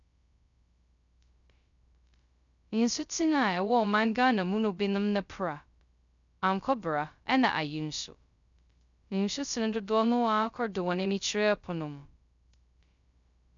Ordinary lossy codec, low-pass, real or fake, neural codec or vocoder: none; 7.2 kHz; fake; codec, 16 kHz, 0.2 kbps, FocalCodec